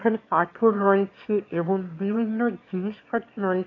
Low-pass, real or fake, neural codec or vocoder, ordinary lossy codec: 7.2 kHz; fake; autoencoder, 22.05 kHz, a latent of 192 numbers a frame, VITS, trained on one speaker; AAC, 32 kbps